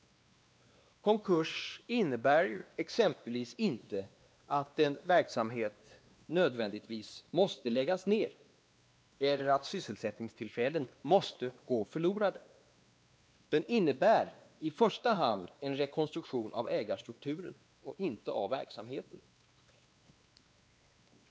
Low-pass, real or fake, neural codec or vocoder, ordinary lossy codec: none; fake; codec, 16 kHz, 2 kbps, X-Codec, WavLM features, trained on Multilingual LibriSpeech; none